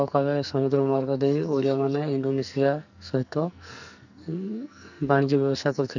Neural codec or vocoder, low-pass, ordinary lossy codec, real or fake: codec, 44.1 kHz, 2.6 kbps, SNAC; 7.2 kHz; none; fake